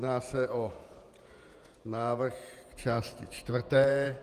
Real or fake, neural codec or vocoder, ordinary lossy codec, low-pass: fake; vocoder, 48 kHz, 128 mel bands, Vocos; Opus, 32 kbps; 14.4 kHz